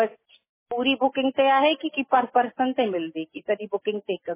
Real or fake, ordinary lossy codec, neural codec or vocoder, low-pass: real; MP3, 16 kbps; none; 3.6 kHz